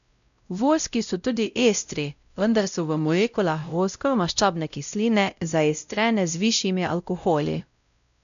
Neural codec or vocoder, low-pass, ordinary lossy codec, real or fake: codec, 16 kHz, 0.5 kbps, X-Codec, WavLM features, trained on Multilingual LibriSpeech; 7.2 kHz; none; fake